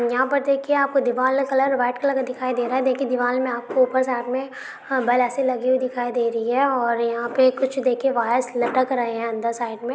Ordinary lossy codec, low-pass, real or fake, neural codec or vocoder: none; none; real; none